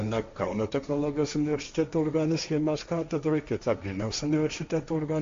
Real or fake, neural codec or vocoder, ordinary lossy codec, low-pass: fake; codec, 16 kHz, 1.1 kbps, Voila-Tokenizer; MP3, 48 kbps; 7.2 kHz